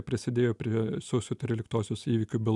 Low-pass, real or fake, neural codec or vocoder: 10.8 kHz; real; none